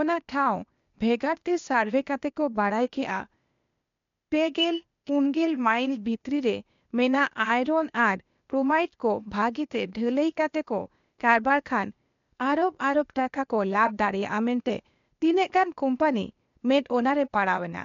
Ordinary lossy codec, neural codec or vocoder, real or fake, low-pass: MP3, 64 kbps; codec, 16 kHz, 0.8 kbps, ZipCodec; fake; 7.2 kHz